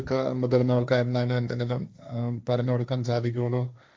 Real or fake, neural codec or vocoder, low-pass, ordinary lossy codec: fake; codec, 16 kHz, 1.1 kbps, Voila-Tokenizer; none; none